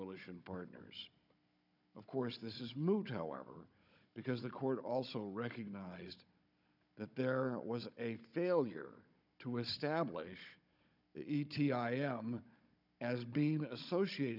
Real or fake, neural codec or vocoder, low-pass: fake; codec, 16 kHz, 16 kbps, FunCodec, trained on Chinese and English, 50 frames a second; 5.4 kHz